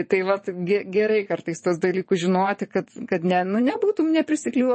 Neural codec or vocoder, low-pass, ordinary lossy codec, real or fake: vocoder, 22.05 kHz, 80 mel bands, WaveNeXt; 9.9 kHz; MP3, 32 kbps; fake